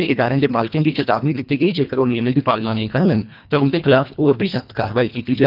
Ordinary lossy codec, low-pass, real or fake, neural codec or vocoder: none; 5.4 kHz; fake; codec, 24 kHz, 1.5 kbps, HILCodec